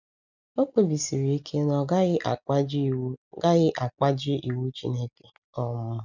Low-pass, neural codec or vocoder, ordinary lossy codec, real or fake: 7.2 kHz; none; none; real